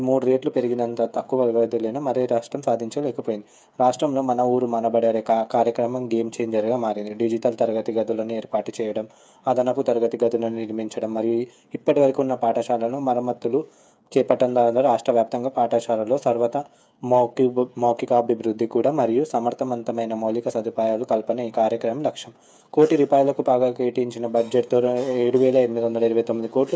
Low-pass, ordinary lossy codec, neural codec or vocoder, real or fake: none; none; codec, 16 kHz, 8 kbps, FreqCodec, smaller model; fake